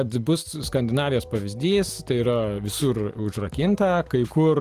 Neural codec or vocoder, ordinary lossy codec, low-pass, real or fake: none; Opus, 24 kbps; 14.4 kHz; real